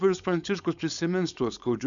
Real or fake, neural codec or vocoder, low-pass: fake; codec, 16 kHz, 4.8 kbps, FACodec; 7.2 kHz